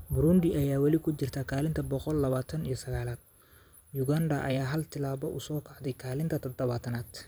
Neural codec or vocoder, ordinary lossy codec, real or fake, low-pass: vocoder, 44.1 kHz, 128 mel bands every 256 samples, BigVGAN v2; none; fake; none